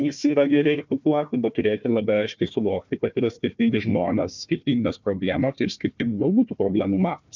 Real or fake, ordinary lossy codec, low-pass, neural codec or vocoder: fake; MP3, 64 kbps; 7.2 kHz; codec, 16 kHz, 1 kbps, FunCodec, trained on Chinese and English, 50 frames a second